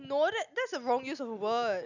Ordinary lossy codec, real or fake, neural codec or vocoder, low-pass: none; real; none; 7.2 kHz